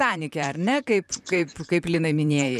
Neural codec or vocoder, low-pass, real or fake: vocoder, 44.1 kHz, 128 mel bands, Pupu-Vocoder; 14.4 kHz; fake